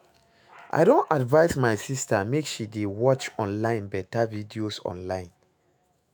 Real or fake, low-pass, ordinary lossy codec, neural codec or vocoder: fake; none; none; autoencoder, 48 kHz, 128 numbers a frame, DAC-VAE, trained on Japanese speech